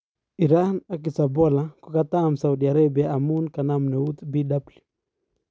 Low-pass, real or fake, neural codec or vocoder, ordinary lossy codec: none; real; none; none